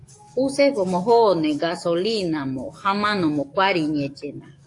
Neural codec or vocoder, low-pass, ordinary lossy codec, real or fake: codec, 44.1 kHz, 7.8 kbps, DAC; 10.8 kHz; AAC, 48 kbps; fake